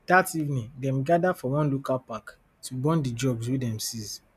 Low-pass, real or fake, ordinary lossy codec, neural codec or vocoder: 14.4 kHz; real; none; none